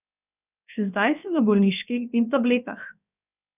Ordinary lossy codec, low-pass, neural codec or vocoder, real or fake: none; 3.6 kHz; codec, 16 kHz, 0.7 kbps, FocalCodec; fake